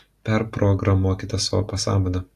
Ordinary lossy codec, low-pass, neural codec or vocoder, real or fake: AAC, 64 kbps; 14.4 kHz; none; real